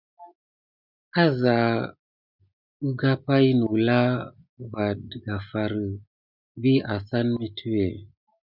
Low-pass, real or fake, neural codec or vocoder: 5.4 kHz; real; none